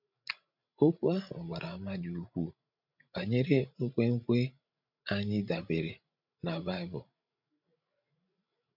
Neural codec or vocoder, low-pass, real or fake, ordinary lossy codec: codec, 16 kHz, 16 kbps, FreqCodec, larger model; 5.4 kHz; fake; none